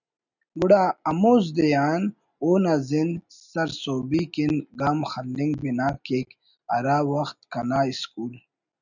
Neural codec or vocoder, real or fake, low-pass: none; real; 7.2 kHz